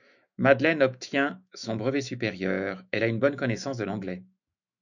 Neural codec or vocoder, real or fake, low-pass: autoencoder, 48 kHz, 128 numbers a frame, DAC-VAE, trained on Japanese speech; fake; 7.2 kHz